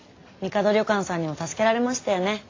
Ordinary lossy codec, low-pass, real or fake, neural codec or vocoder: AAC, 32 kbps; 7.2 kHz; real; none